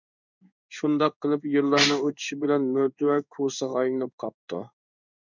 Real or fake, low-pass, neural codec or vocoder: fake; 7.2 kHz; codec, 16 kHz in and 24 kHz out, 1 kbps, XY-Tokenizer